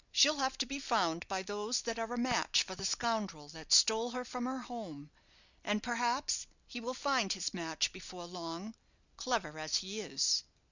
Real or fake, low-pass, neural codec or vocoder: real; 7.2 kHz; none